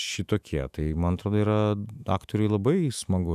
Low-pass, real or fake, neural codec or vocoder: 14.4 kHz; fake; autoencoder, 48 kHz, 128 numbers a frame, DAC-VAE, trained on Japanese speech